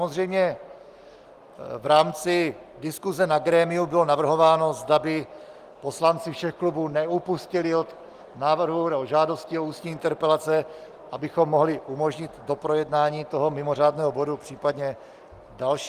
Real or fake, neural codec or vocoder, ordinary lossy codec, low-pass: real; none; Opus, 24 kbps; 14.4 kHz